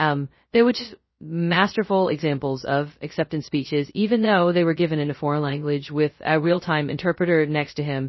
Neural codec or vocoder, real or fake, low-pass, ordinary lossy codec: codec, 16 kHz, 0.2 kbps, FocalCodec; fake; 7.2 kHz; MP3, 24 kbps